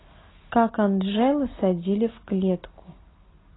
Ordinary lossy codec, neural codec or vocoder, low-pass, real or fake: AAC, 16 kbps; none; 7.2 kHz; real